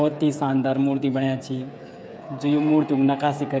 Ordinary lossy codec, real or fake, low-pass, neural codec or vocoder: none; fake; none; codec, 16 kHz, 8 kbps, FreqCodec, smaller model